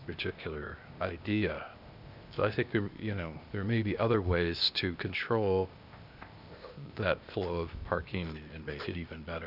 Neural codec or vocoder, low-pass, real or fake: codec, 16 kHz, 0.8 kbps, ZipCodec; 5.4 kHz; fake